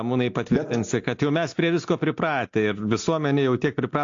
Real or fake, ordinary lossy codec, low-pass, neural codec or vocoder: real; AAC, 48 kbps; 7.2 kHz; none